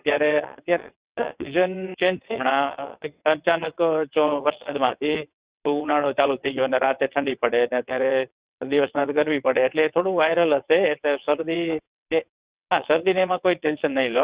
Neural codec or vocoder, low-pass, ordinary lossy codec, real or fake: vocoder, 22.05 kHz, 80 mel bands, WaveNeXt; 3.6 kHz; Opus, 64 kbps; fake